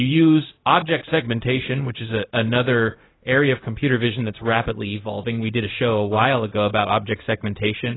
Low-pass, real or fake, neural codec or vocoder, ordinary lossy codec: 7.2 kHz; fake; codec, 16 kHz, 0.4 kbps, LongCat-Audio-Codec; AAC, 16 kbps